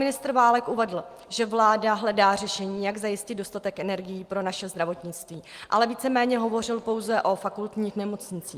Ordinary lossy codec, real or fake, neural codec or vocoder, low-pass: Opus, 32 kbps; real; none; 14.4 kHz